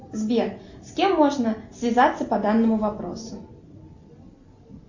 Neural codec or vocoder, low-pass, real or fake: none; 7.2 kHz; real